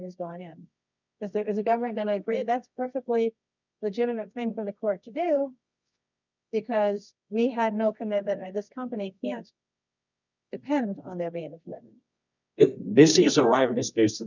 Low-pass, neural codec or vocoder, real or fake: 7.2 kHz; codec, 24 kHz, 0.9 kbps, WavTokenizer, medium music audio release; fake